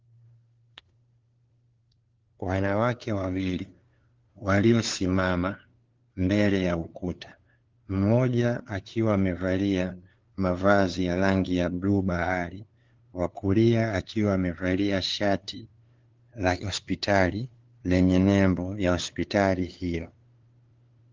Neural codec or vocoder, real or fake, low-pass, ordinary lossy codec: codec, 16 kHz, 2 kbps, FunCodec, trained on LibriTTS, 25 frames a second; fake; 7.2 kHz; Opus, 16 kbps